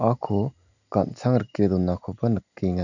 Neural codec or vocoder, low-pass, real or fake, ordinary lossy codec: none; 7.2 kHz; real; none